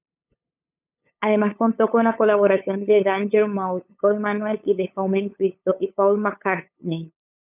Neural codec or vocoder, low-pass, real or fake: codec, 16 kHz, 8 kbps, FunCodec, trained on LibriTTS, 25 frames a second; 3.6 kHz; fake